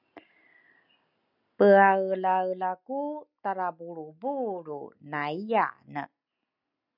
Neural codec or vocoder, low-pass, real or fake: none; 5.4 kHz; real